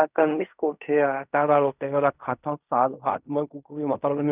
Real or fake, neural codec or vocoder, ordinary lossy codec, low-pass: fake; codec, 16 kHz in and 24 kHz out, 0.4 kbps, LongCat-Audio-Codec, fine tuned four codebook decoder; none; 3.6 kHz